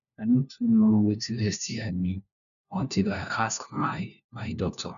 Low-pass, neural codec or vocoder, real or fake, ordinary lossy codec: 7.2 kHz; codec, 16 kHz, 1 kbps, FunCodec, trained on LibriTTS, 50 frames a second; fake; none